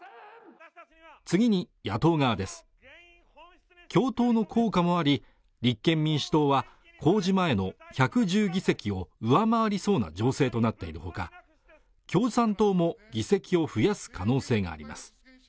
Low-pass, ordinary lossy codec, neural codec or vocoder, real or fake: none; none; none; real